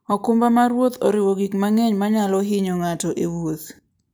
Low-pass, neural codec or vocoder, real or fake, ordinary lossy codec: none; none; real; none